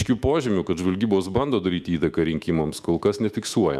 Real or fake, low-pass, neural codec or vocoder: fake; 14.4 kHz; autoencoder, 48 kHz, 128 numbers a frame, DAC-VAE, trained on Japanese speech